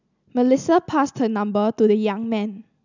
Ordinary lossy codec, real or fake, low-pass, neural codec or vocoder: none; real; 7.2 kHz; none